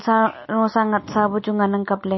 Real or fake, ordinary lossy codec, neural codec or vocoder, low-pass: real; MP3, 24 kbps; none; 7.2 kHz